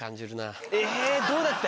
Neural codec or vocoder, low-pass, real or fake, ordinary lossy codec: none; none; real; none